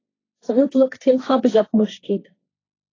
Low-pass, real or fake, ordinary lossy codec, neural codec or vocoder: 7.2 kHz; fake; AAC, 32 kbps; codec, 16 kHz, 1.1 kbps, Voila-Tokenizer